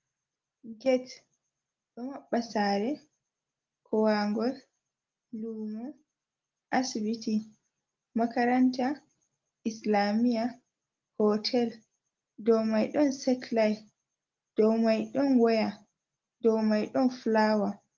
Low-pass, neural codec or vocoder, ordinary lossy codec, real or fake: 7.2 kHz; none; Opus, 32 kbps; real